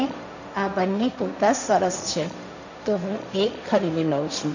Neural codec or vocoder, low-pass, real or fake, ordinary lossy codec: codec, 16 kHz, 1.1 kbps, Voila-Tokenizer; 7.2 kHz; fake; none